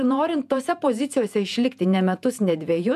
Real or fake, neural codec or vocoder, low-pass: fake; vocoder, 48 kHz, 128 mel bands, Vocos; 14.4 kHz